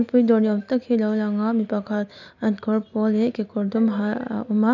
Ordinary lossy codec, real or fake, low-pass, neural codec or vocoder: none; real; 7.2 kHz; none